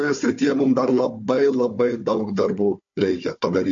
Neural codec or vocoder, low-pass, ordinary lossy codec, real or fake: codec, 16 kHz, 4 kbps, FunCodec, trained on Chinese and English, 50 frames a second; 7.2 kHz; MP3, 48 kbps; fake